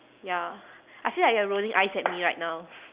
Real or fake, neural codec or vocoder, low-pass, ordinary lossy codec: real; none; 3.6 kHz; Opus, 64 kbps